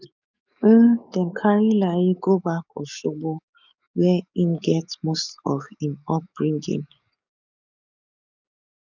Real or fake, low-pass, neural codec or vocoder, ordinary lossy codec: fake; 7.2 kHz; codec, 44.1 kHz, 7.8 kbps, DAC; none